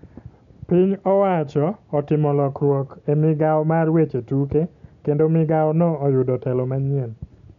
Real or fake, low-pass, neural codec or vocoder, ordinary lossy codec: real; 7.2 kHz; none; MP3, 96 kbps